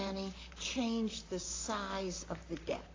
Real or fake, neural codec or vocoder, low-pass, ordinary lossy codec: fake; vocoder, 44.1 kHz, 128 mel bands, Pupu-Vocoder; 7.2 kHz; AAC, 32 kbps